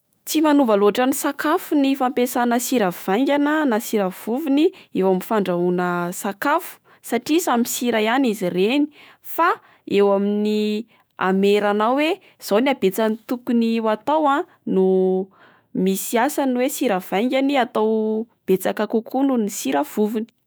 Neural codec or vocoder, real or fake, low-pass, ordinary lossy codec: autoencoder, 48 kHz, 128 numbers a frame, DAC-VAE, trained on Japanese speech; fake; none; none